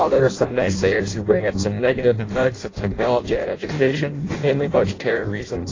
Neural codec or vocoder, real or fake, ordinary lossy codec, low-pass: codec, 16 kHz in and 24 kHz out, 0.6 kbps, FireRedTTS-2 codec; fake; AAC, 32 kbps; 7.2 kHz